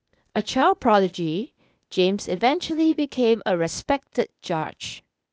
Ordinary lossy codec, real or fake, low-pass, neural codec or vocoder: none; fake; none; codec, 16 kHz, 0.8 kbps, ZipCodec